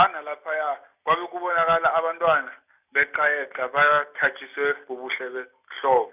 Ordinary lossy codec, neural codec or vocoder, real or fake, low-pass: none; none; real; 3.6 kHz